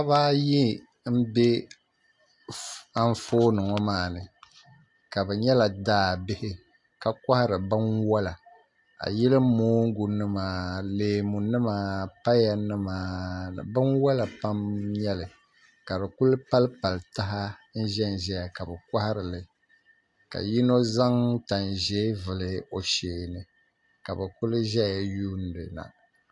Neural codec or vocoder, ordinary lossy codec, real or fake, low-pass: none; AAC, 64 kbps; real; 10.8 kHz